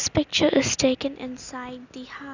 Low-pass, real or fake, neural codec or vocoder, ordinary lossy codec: 7.2 kHz; real; none; none